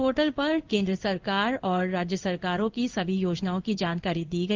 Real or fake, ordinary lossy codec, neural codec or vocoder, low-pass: fake; Opus, 32 kbps; codec, 16 kHz in and 24 kHz out, 1 kbps, XY-Tokenizer; 7.2 kHz